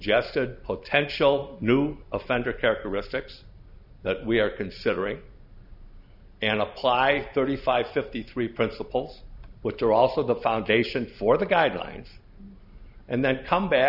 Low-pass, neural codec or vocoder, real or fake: 5.4 kHz; none; real